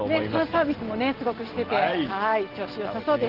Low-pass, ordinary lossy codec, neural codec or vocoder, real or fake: 5.4 kHz; Opus, 16 kbps; none; real